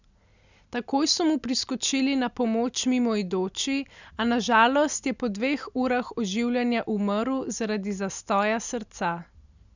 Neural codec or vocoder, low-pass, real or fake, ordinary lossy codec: none; 7.2 kHz; real; none